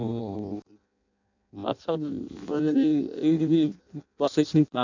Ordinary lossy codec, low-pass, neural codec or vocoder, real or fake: none; 7.2 kHz; codec, 16 kHz in and 24 kHz out, 0.6 kbps, FireRedTTS-2 codec; fake